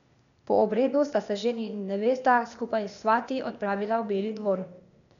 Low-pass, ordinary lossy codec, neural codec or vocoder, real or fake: 7.2 kHz; none; codec, 16 kHz, 0.8 kbps, ZipCodec; fake